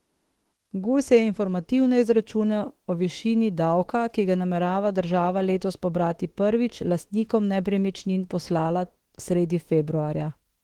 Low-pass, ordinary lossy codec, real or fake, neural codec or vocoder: 19.8 kHz; Opus, 16 kbps; fake; autoencoder, 48 kHz, 32 numbers a frame, DAC-VAE, trained on Japanese speech